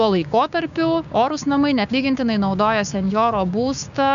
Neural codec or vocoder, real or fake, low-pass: codec, 16 kHz, 6 kbps, DAC; fake; 7.2 kHz